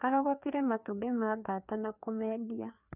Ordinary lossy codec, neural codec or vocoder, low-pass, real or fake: none; codec, 16 kHz, 2 kbps, FreqCodec, larger model; 3.6 kHz; fake